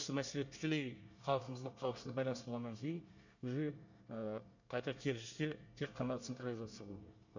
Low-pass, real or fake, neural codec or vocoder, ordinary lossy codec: 7.2 kHz; fake; codec, 24 kHz, 1 kbps, SNAC; none